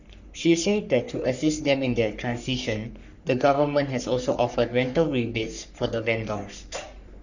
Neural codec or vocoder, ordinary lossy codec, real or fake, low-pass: codec, 44.1 kHz, 3.4 kbps, Pupu-Codec; none; fake; 7.2 kHz